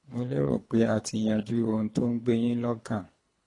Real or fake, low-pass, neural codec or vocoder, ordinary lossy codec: fake; 10.8 kHz; codec, 24 kHz, 3 kbps, HILCodec; AAC, 32 kbps